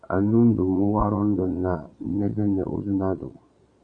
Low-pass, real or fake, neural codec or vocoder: 9.9 kHz; fake; vocoder, 22.05 kHz, 80 mel bands, Vocos